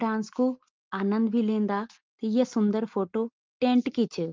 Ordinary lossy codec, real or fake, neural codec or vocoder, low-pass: Opus, 24 kbps; real; none; 7.2 kHz